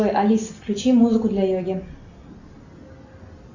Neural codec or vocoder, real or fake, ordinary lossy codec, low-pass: none; real; Opus, 64 kbps; 7.2 kHz